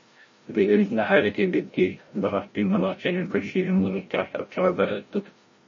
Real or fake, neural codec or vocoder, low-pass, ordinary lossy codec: fake; codec, 16 kHz, 0.5 kbps, FreqCodec, larger model; 7.2 kHz; MP3, 32 kbps